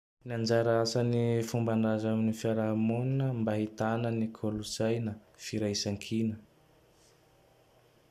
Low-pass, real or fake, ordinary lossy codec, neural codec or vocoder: 14.4 kHz; real; none; none